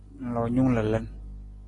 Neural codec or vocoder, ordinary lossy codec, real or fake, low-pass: none; AAC, 32 kbps; real; 10.8 kHz